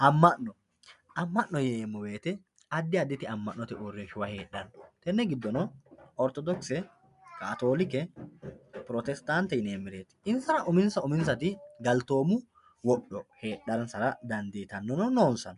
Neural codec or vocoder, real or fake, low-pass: none; real; 10.8 kHz